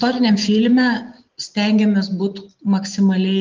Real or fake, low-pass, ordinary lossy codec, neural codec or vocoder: real; 7.2 kHz; Opus, 32 kbps; none